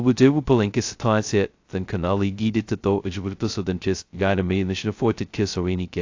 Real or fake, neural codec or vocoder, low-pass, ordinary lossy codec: fake; codec, 16 kHz, 0.2 kbps, FocalCodec; 7.2 kHz; AAC, 48 kbps